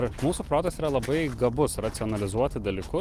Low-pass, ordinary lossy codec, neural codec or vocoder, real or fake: 14.4 kHz; Opus, 24 kbps; none; real